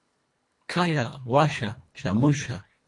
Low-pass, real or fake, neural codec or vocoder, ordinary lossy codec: 10.8 kHz; fake; codec, 24 kHz, 1.5 kbps, HILCodec; MP3, 64 kbps